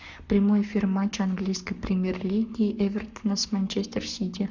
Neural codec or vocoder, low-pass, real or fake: codec, 44.1 kHz, 7.8 kbps, Pupu-Codec; 7.2 kHz; fake